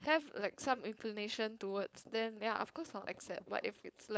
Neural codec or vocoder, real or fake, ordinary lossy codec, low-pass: codec, 16 kHz, 4.8 kbps, FACodec; fake; none; none